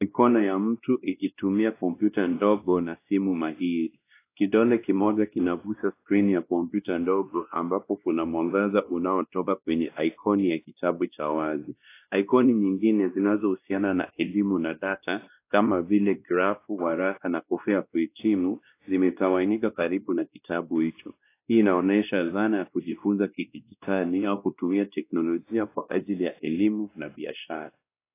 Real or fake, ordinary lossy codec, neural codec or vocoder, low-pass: fake; AAC, 24 kbps; codec, 16 kHz, 1 kbps, X-Codec, WavLM features, trained on Multilingual LibriSpeech; 3.6 kHz